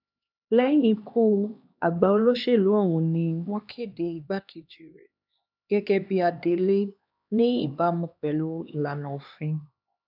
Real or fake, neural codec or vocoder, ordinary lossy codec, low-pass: fake; codec, 16 kHz, 2 kbps, X-Codec, HuBERT features, trained on LibriSpeech; AAC, 48 kbps; 5.4 kHz